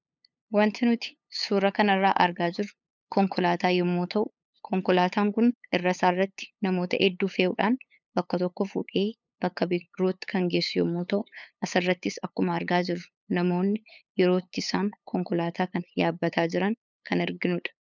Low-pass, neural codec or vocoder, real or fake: 7.2 kHz; codec, 16 kHz, 8 kbps, FunCodec, trained on LibriTTS, 25 frames a second; fake